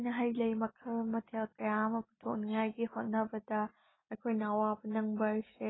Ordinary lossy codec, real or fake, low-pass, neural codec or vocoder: AAC, 16 kbps; real; 7.2 kHz; none